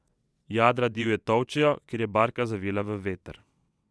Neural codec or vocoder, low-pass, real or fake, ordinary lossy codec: vocoder, 22.05 kHz, 80 mel bands, WaveNeXt; none; fake; none